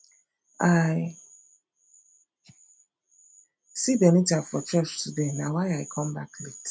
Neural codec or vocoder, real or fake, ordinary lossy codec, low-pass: none; real; none; none